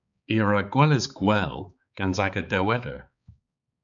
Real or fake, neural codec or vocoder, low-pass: fake; codec, 16 kHz, 4 kbps, X-Codec, HuBERT features, trained on balanced general audio; 7.2 kHz